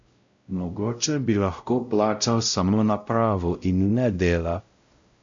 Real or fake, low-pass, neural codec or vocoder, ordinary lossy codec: fake; 7.2 kHz; codec, 16 kHz, 0.5 kbps, X-Codec, WavLM features, trained on Multilingual LibriSpeech; MP3, 64 kbps